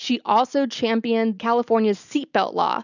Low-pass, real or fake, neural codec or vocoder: 7.2 kHz; real; none